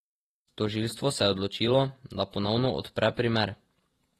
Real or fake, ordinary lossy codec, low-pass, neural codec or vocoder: fake; AAC, 32 kbps; 19.8 kHz; vocoder, 48 kHz, 128 mel bands, Vocos